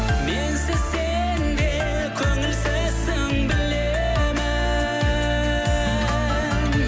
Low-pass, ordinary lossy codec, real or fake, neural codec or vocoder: none; none; real; none